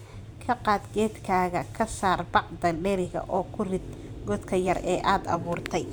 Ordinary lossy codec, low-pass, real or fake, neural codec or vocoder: none; none; fake; vocoder, 44.1 kHz, 128 mel bands every 512 samples, BigVGAN v2